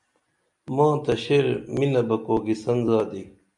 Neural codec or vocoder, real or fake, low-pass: none; real; 10.8 kHz